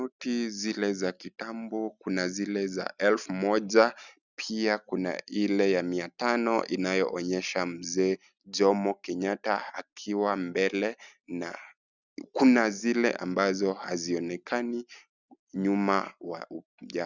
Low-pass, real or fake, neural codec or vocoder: 7.2 kHz; real; none